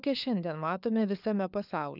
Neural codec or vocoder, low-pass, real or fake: codec, 16 kHz, 4 kbps, FunCodec, trained on LibriTTS, 50 frames a second; 5.4 kHz; fake